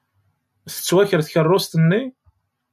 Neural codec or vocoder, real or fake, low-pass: none; real; 14.4 kHz